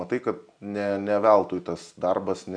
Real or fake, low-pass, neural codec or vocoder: real; 9.9 kHz; none